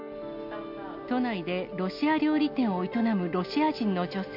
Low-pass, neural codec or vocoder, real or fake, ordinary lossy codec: 5.4 kHz; none; real; MP3, 48 kbps